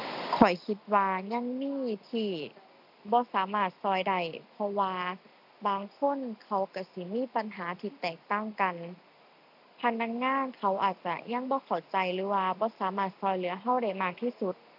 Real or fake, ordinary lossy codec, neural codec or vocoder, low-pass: real; AAC, 48 kbps; none; 5.4 kHz